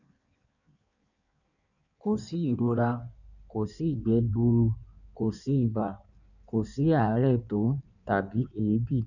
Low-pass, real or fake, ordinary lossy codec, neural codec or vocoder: 7.2 kHz; fake; none; codec, 16 kHz in and 24 kHz out, 1.1 kbps, FireRedTTS-2 codec